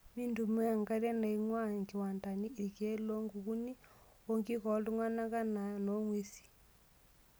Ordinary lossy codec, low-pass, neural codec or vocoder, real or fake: none; none; none; real